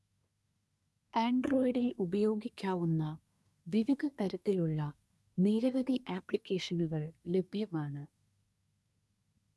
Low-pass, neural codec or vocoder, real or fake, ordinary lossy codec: none; codec, 24 kHz, 1 kbps, SNAC; fake; none